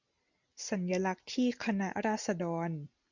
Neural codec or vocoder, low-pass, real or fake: none; 7.2 kHz; real